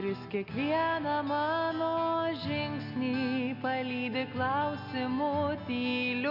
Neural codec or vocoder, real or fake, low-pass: none; real; 5.4 kHz